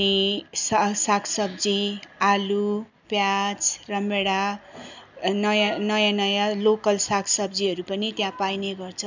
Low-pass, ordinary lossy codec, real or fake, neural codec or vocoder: 7.2 kHz; none; real; none